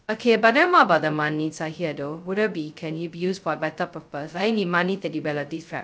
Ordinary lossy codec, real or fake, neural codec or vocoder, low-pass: none; fake; codec, 16 kHz, 0.2 kbps, FocalCodec; none